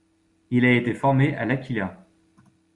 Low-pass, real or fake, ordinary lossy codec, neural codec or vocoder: 10.8 kHz; real; Opus, 64 kbps; none